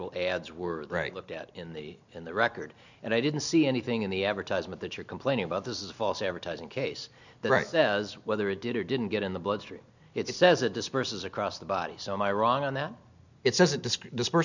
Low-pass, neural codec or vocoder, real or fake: 7.2 kHz; none; real